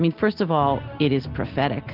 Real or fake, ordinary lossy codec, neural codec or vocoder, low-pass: real; Opus, 16 kbps; none; 5.4 kHz